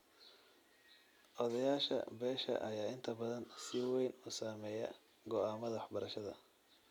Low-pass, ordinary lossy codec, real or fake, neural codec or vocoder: 19.8 kHz; none; real; none